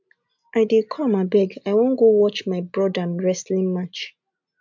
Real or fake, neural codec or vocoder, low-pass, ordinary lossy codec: real; none; 7.2 kHz; MP3, 64 kbps